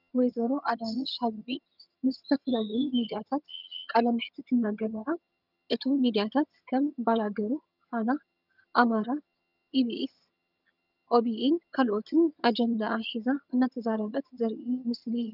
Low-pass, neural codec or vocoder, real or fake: 5.4 kHz; vocoder, 22.05 kHz, 80 mel bands, HiFi-GAN; fake